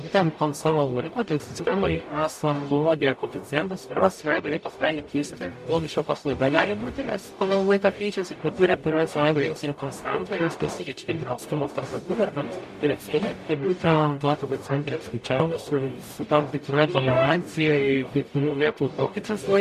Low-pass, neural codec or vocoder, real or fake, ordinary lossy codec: 14.4 kHz; codec, 44.1 kHz, 0.9 kbps, DAC; fake; MP3, 64 kbps